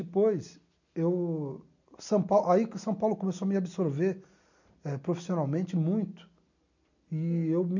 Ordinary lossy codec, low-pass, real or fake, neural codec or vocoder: none; 7.2 kHz; real; none